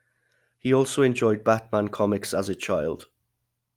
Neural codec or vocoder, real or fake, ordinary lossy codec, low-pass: none; real; Opus, 32 kbps; 19.8 kHz